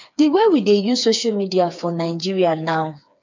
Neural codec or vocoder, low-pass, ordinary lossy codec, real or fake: codec, 16 kHz, 4 kbps, FreqCodec, smaller model; 7.2 kHz; MP3, 64 kbps; fake